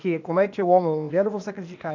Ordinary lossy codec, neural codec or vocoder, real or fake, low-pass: none; codec, 16 kHz, 0.8 kbps, ZipCodec; fake; 7.2 kHz